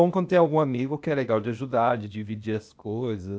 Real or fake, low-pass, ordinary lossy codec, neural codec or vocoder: fake; none; none; codec, 16 kHz, 0.8 kbps, ZipCodec